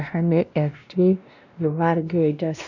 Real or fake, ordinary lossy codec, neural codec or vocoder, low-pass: fake; none; codec, 16 kHz, 1 kbps, X-Codec, WavLM features, trained on Multilingual LibriSpeech; 7.2 kHz